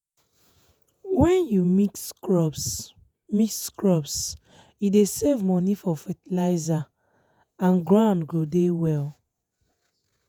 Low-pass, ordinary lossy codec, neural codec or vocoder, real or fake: none; none; vocoder, 48 kHz, 128 mel bands, Vocos; fake